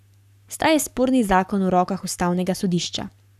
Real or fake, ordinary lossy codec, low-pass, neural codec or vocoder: fake; none; 14.4 kHz; codec, 44.1 kHz, 7.8 kbps, Pupu-Codec